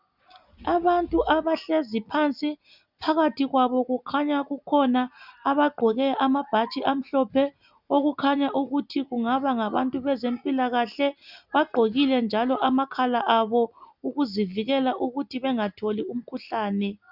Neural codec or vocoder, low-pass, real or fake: none; 5.4 kHz; real